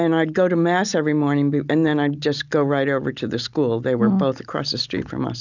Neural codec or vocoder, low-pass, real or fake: none; 7.2 kHz; real